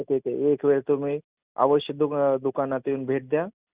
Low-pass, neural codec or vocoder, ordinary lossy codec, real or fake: 3.6 kHz; none; Opus, 64 kbps; real